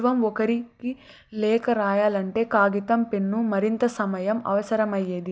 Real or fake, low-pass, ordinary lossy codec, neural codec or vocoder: real; none; none; none